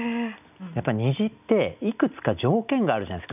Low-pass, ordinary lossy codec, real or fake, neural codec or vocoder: 3.6 kHz; none; real; none